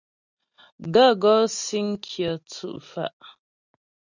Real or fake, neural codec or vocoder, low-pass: real; none; 7.2 kHz